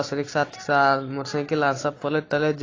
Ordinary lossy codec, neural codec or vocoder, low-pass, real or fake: AAC, 32 kbps; none; 7.2 kHz; real